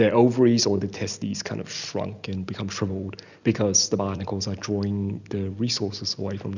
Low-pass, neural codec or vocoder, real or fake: 7.2 kHz; none; real